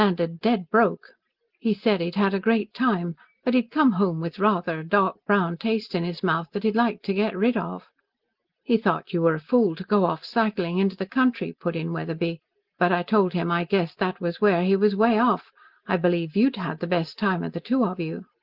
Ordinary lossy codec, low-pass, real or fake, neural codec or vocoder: Opus, 16 kbps; 5.4 kHz; real; none